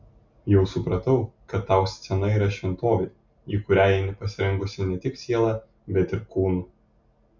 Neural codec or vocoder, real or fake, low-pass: none; real; 7.2 kHz